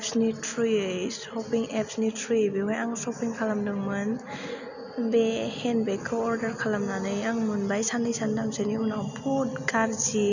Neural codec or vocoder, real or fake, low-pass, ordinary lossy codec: none; real; 7.2 kHz; none